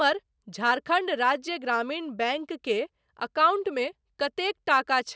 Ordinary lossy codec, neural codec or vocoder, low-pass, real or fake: none; none; none; real